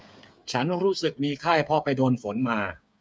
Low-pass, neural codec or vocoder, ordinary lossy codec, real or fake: none; codec, 16 kHz, 8 kbps, FreqCodec, smaller model; none; fake